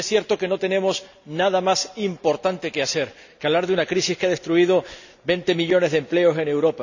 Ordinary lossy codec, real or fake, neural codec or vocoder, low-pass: none; real; none; 7.2 kHz